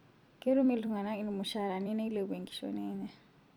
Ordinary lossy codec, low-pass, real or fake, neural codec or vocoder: none; 19.8 kHz; fake; vocoder, 44.1 kHz, 128 mel bands every 256 samples, BigVGAN v2